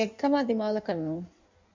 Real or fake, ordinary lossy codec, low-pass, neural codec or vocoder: fake; MP3, 64 kbps; 7.2 kHz; codec, 16 kHz in and 24 kHz out, 1.1 kbps, FireRedTTS-2 codec